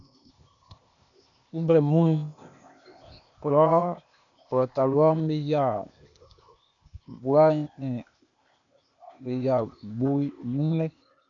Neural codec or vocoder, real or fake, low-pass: codec, 16 kHz, 0.8 kbps, ZipCodec; fake; 7.2 kHz